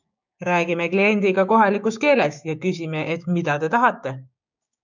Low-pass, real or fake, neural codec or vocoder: 7.2 kHz; fake; codec, 16 kHz, 6 kbps, DAC